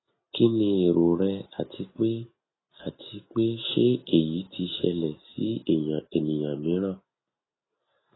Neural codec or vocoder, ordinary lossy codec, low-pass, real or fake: none; AAC, 16 kbps; 7.2 kHz; real